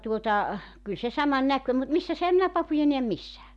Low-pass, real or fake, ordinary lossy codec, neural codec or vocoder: none; real; none; none